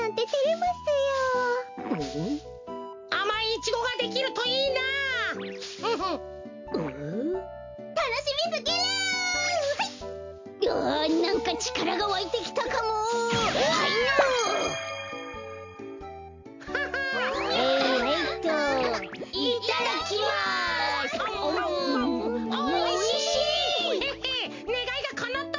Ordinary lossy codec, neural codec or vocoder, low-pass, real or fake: MP3, 48 kbps; none; 7.2 kHz; real